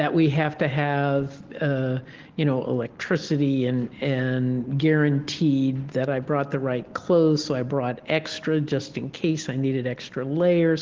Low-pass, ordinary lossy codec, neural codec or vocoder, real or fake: 7.2 kHz; Opus, 16 kbps; none; real